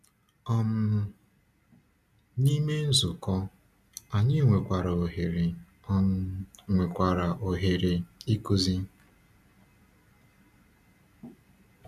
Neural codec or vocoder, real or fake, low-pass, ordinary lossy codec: none; real; 14.4 kHz; none